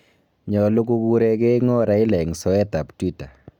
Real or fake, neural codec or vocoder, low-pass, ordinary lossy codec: real; none; 19.8 kHz; none